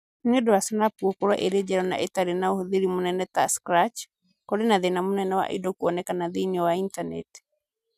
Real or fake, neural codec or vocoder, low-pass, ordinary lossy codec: real; none; 14.4 kHz; none